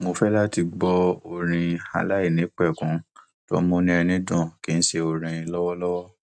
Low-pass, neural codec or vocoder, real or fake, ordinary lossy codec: none; none; real; none